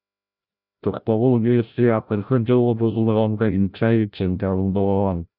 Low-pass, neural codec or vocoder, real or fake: 5.4 kHz; codec, 16 kHz, 0.5 kbps, FreqCodec, larger model; fake